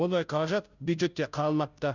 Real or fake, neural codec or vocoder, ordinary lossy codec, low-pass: fake; codec, 16 kHz, 0.5 kbps, FunCodec, trained on Chinese and English, 25 frames a second; none; 7.2 kHz